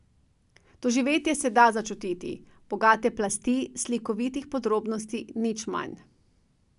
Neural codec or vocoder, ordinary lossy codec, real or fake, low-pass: none; none; real; 10.8 kHz